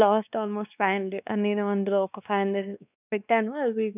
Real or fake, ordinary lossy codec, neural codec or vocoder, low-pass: fake; none; codec, 16 kHz, 2 kbps, X-Codec, WavLM features, trained on Multilingual LibriSpeech; 3.6 kHz